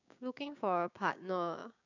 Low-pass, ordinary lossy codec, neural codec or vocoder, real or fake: 7.2 kHz; none; codec, 16 kHz, 6 kbps, DAC; fake